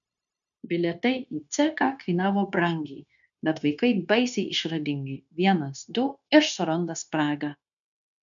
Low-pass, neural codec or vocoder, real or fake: 7.2 kHz; codec, 16 kHz, 0.9 kbps, LongCat-Audio-Codec; fake